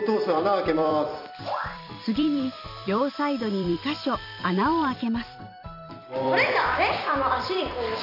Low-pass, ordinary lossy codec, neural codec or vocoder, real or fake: 5.4 kHz; none; vocoder, 44.1 kHz, 128 mel bands every 256 samples, BigVGAN v2; fake